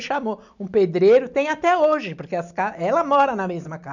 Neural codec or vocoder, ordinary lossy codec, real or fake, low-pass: none; none; real; 7.2 kHz